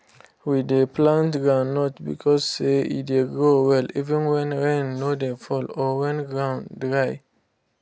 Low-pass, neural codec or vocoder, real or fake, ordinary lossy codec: none; none; real; none